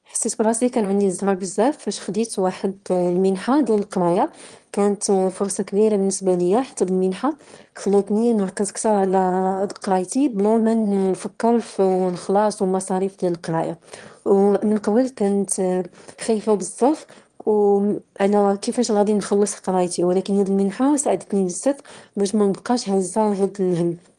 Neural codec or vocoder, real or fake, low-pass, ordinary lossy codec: autoencoder, 22.05 kHz, a latent of 192 numbers a frame, VITS, trained on one speaker; fake; 9.9 kHz; Opus, 24 kbps